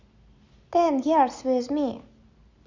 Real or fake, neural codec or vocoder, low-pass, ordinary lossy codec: real; none; 7.2 kHz; Opus, 64 kbps